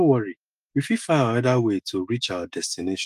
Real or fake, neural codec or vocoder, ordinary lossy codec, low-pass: fake; autoencoder, 48 kHz, 128 numbers a frame, DAC-VAE, trained on Japanese speech; Opus, 24 kbps; 14.4 kHz